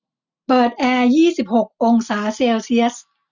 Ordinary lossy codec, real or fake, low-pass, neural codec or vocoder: none; real; 7.2 kHz; none